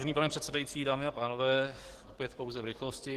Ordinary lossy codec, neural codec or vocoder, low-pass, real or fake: Opus, 16 kbps; codec, 44.1 kHz, 7.8 kbps, DAC; 14.4 kHz; fake